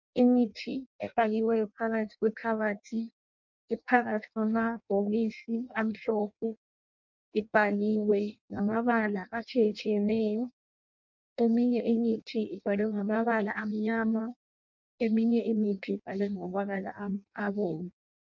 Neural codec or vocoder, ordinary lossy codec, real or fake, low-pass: codec, 16 kHz in and 24 kHz out, 0.6 kbps, FireRedTTS-2 codec; MP3, 48 kbps; fake; 7.2 kHz